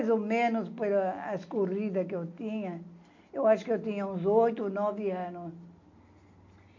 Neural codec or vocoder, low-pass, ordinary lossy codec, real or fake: none; 7.2 kHz; MP3, 48 kbps; real